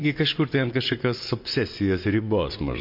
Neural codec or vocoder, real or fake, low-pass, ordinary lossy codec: vocoder, 24 kHz, 100 mel bands, Vocos; fake; 5.4 kHz; MP3, 32 kbps